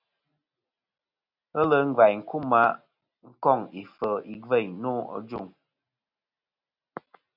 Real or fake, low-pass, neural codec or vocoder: real; 5.4 kHz; none